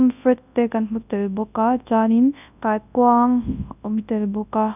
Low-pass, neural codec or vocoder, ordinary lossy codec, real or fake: 3.6 kHz; codec, 24 kHz, 0.9 kbps, WavTokenizer, large speech release; none; fake